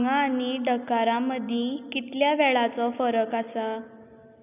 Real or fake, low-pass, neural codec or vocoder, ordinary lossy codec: real; 3.6 kHz; none; none